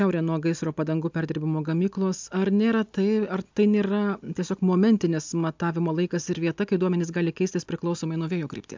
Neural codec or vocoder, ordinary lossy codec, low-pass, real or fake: none; MP3, 64 kbps; 7.2 kHz; real